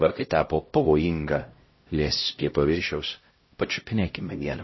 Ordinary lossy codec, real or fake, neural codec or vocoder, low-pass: MP3, 24 kbps; fake; codec, 16 kHz, 0.5 kbps, X-Codec, HuBERT features, trained on LibriSpeech; 7.2 kHz